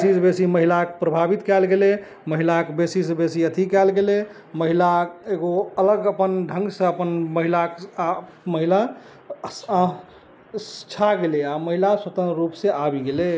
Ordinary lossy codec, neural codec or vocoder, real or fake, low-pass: none; none; real; none